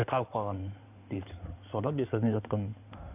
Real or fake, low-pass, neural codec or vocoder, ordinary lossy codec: fake; 3.6 kHz; codec, 16 kHz in and 24 kHz out, 2.2 kbps, FireRedTTS-2 codec; none